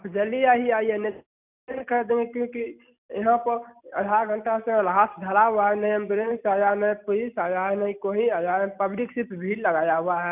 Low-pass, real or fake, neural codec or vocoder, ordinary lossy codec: 3.6 kHz; real; none; none